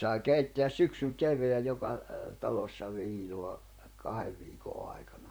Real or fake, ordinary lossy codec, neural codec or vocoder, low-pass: fake; none; vocoder, 44.1 kHz, 128 mel bands, Pupu-Vocoder; none